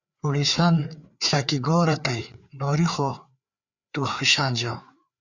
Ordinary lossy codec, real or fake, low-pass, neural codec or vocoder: Opus, 64 kbps; fake; 7.2 kHz; codec, 16 kHz, 4 kbps, FreqCodec, larger model